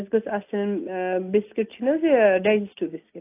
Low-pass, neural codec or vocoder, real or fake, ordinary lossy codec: 3.6 kHz; none; real; AAC, 24 kbps